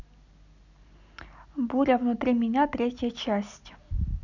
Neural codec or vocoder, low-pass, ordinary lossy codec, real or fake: none; 7.2 kHz; none; real